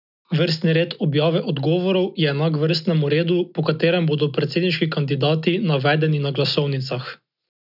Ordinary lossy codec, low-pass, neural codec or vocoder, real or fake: none; 5.4 kHz; none; real